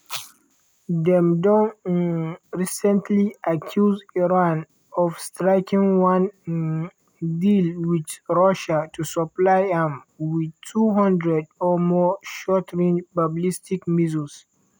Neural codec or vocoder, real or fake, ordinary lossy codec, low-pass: none; real; none; none